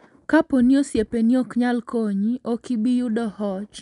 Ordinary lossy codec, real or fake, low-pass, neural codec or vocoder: none; real; 10.8 kHz; none